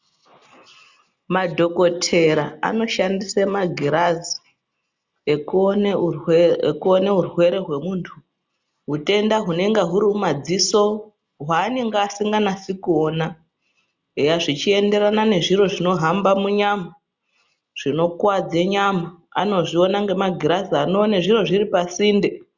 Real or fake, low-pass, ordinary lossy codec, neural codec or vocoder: real; 7.2 kHz; Opus, 64 kbps; none